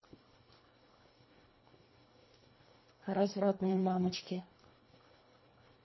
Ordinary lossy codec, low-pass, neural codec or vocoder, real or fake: MP3, 24 kbps; 7.2 kHz; codec, 24 kHz, 1.5 kbps, HILCodec; fake